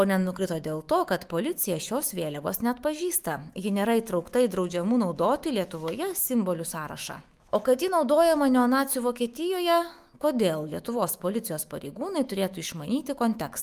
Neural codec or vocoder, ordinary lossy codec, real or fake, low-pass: autoencoder, 48 kHz, 128 numbers a frame, DAC-VAE, trained on Japanese speech; Opus, 32 kbps; fake; 14.4 kHz